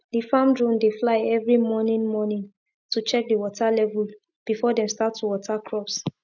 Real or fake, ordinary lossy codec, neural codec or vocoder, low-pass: real; none; none; 7.2 kHz